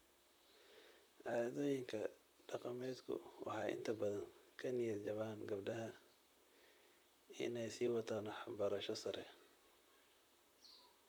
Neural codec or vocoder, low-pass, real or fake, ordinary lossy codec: vocoder, 44.1 kHz, 128 mel bands, Pupu-Vocoder; none; fake; none